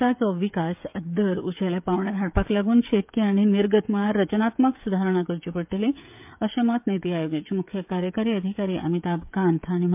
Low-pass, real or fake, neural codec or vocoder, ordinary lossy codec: 3.6 kHz; fake; codec, 16 kHz, 16 kbps, FreqCodec, smaller model; MP3, 32 kbps